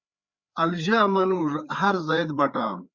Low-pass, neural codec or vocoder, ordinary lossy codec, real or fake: 7.2 kHz; codec, 16 kHz, 4 kbps, FreqCodec, larger model; Opus, 64 kbps; fake